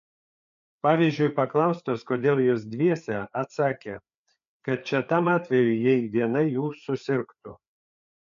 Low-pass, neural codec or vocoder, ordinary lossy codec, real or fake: 7.2 kHz; codec, 16 kHz, 4 kbps, FreqCodec, larger model; MP3, 64 kbps; fake